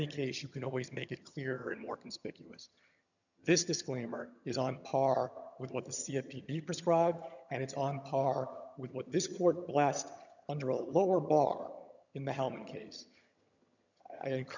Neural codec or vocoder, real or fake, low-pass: vocoder, 22.05 kHz, 80 mel bands, HiFi-GAN; fake; 7.2 kHz